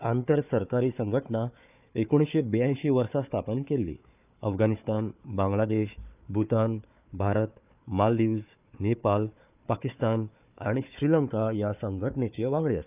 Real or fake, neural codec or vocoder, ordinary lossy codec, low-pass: fake; codec, 16 kHz, 4 kbps, FunCodec, trained on Chinese and English, 50 frames a second; none; 3.6 kHz